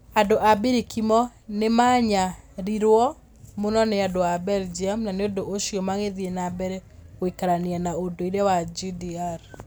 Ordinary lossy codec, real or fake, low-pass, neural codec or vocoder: none; real; none; none